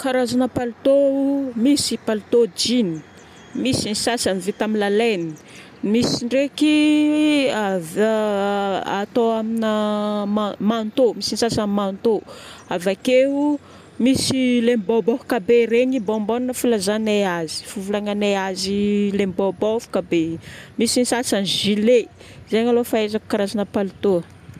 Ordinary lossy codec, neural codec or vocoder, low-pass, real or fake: none; none; 14.4 kHz; real